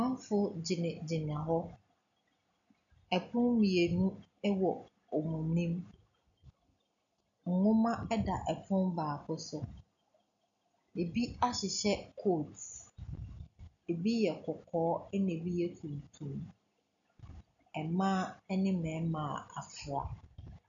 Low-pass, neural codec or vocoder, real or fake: 7.2 kHz; none; real